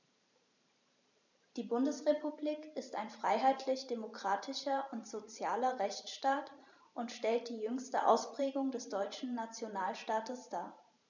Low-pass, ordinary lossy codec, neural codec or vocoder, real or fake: 7.2 kHz; none; vocoder, 44.1 kHz, 128 mel bands every 512 samples, BigVGAN v2; fake